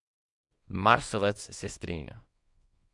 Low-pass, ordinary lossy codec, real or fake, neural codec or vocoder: 10.8 kHz; MP3, 64 kbps; fake; codec, 24 kHz, 0.9 kbps, WavTokenizer, small release